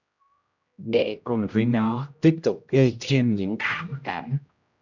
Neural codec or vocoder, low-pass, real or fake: codec, 16 kHz, 0.5 kbps, X-Codec, HuBERT features, trained on general audio; 7.2 kHz; fake